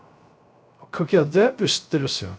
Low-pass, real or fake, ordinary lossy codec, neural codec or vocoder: none; fake; none; codec, 16 kHz, 0.3 kbps, FocalCodec